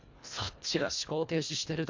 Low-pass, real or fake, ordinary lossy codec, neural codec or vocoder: 7.2 kHz; fake; MP3, 64 kbps; codec, 24 kHz, 1.5 kbps, HILCodec